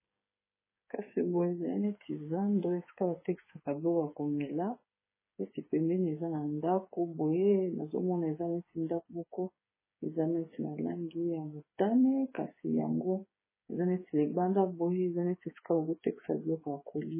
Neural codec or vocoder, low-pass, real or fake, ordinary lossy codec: codec, 16 kHz, 8 kbps, FreqCodec, smaller model; 3.6 kHz; fake; MP3, 16 kbps